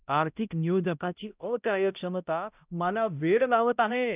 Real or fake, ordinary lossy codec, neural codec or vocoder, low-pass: fake; none; codec, 16 kHz, 0.5 kbps, X-Codec, HuBERT features, trained on balanced general audio; 3.6 kHz